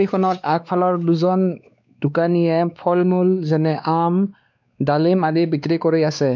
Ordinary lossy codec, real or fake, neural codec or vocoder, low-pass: none; fake; codec, 16 kHz, 2 kbps, X-Codec, WavLM features, trained on Multilingual LibriSpeech; 7.2 kHz